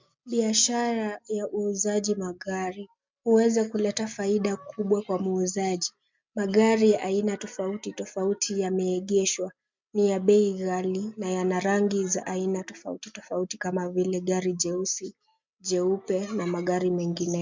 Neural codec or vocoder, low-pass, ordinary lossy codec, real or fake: none; 7.2 kHz; MP3, 64 kbps; real